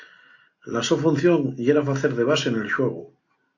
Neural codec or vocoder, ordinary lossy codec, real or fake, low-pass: none; AAC, 48 kbps; real; 7.2 kHz